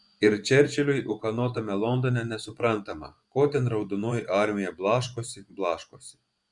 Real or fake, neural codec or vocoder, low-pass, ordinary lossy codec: fake; vocoder, 24 kHz, 100 mel bands, Vocos; 10.8 kHz; Opus, 64 kbps